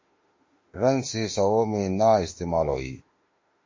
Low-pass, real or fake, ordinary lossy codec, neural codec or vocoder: 7.2 kHz; fake; MP3, 32 kbps; autoencoder, 48 kHz, 32 numbers a frame, DAC-VAE, trained on Japanese speech